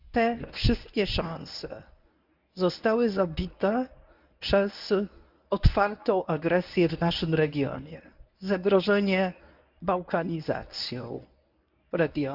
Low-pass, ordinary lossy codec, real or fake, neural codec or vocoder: 5.4 kHz; none; fake; codec, 24 kHz, 0.9 kbps, WavTokenizer, medium speech release version 1